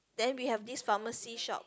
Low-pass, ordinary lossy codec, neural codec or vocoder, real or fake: none; none; none; real